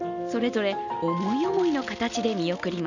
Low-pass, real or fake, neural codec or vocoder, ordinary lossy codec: 7.2 kHz; real; none; none